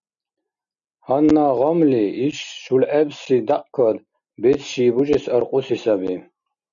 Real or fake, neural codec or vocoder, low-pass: real; none; 7.2 kHz